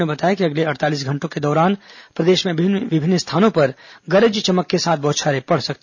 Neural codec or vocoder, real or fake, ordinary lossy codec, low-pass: none; real; none; 7.2 kHz